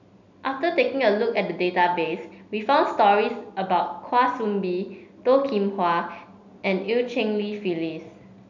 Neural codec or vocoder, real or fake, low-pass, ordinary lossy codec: none; real; 7.2 kHz; none